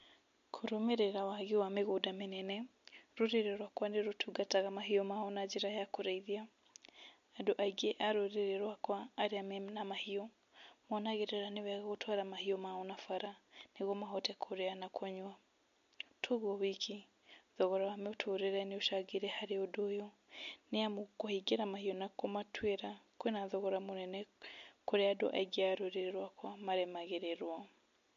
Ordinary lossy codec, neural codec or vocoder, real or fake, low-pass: MP3, 48 kbps; none; real; 7.2 kHz